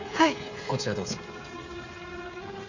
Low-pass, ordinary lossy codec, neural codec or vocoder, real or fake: 7.2 kHz; none; codec, 16 kHz, 8 kbps, FreqCodec, smaller model; fake